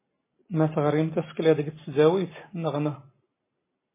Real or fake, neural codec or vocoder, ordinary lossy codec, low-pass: real; none; MP3, 16 kbps; 3.6 kHz